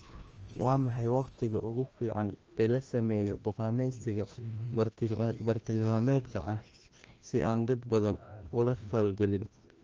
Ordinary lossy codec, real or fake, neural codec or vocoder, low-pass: Opus, 24 kbps; fake; codec, 16 kHz, 1 kbps, FreqCodec, larger model; 7.2 kHz